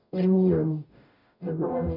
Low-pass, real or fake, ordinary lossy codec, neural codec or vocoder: 5.4 kHz; fake; none; codec, 44.1 kHz, 0.9 kbps, DAC